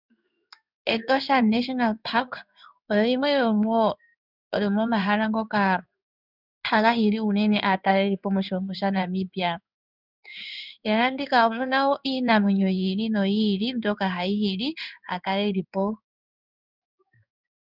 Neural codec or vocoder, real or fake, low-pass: codec, 16 kHz in and 24 kHz out, 1 kbps, XY-Tokenizer; fake; 5.4 kHz